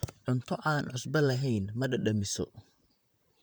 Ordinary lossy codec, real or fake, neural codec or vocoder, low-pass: none; fake; vocoder, 44.1 kHz, 128 mel bands, Pupu-Vocoder; none